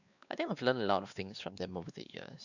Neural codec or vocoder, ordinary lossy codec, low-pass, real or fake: codec, 16 kHz, 2 kbps, X-Codec, WavLM features, trained on Multilingual LibriSpeech; none; 7.2 kHz; fake